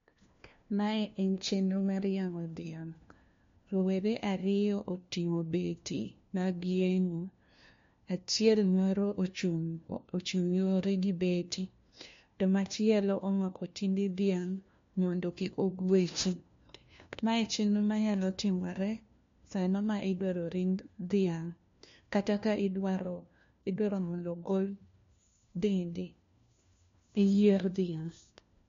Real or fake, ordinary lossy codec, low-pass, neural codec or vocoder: fake; MP3, 48 kbps; 7.2 kHz; codec, 16 kHz, 1 kbps, FunCodec, trained on LibriTTS, 50 frames a second